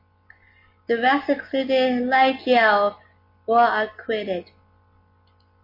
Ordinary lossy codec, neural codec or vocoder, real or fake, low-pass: MP3, 32 kbps; none; real; 5.4 kHz